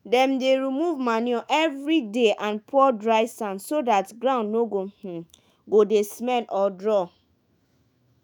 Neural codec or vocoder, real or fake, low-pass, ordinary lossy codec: autoencoder, 48 kHz, 128 numbers a frame, DAC-VAE, trained on Japanese speech; fake; none; none